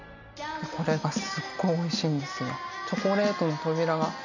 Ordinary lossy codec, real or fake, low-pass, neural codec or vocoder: none; real; 7.2 kHz; none